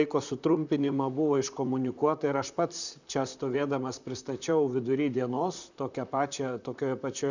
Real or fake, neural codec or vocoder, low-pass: fake; vocoder, 44.1 kHz, 128 mel bands, Pupu-Vocoder; 7.2 kHz